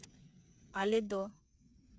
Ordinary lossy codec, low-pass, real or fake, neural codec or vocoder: none; none; fake; codec, 16 kHz, 8 kbps, FreqCodec, smaller model